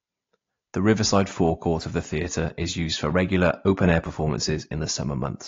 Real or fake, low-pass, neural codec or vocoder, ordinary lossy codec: real; 7.2 kHz; none; AAC, 32 kbps